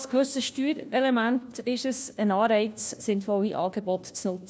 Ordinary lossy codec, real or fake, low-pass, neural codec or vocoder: none; fake; none; codec, 16 kHz, 1 kbps, FunCodec, trained on LibriTTS, 50 frames a second